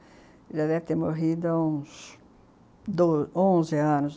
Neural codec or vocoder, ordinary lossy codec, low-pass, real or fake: none; none; none; real